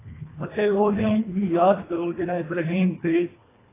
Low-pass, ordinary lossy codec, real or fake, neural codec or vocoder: 3.6 kHz; AAC, 16 kbps; fake; codec, 24 kHz, 1.5 kbps, HILCodec